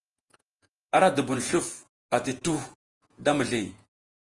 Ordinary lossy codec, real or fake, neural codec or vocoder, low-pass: Opus, 24 kbps; fake; vocoder, 48 kHz, 128 mel bands, Vocos; 10.8 kHz